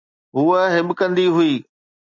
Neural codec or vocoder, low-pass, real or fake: none; 7.2 kHz; real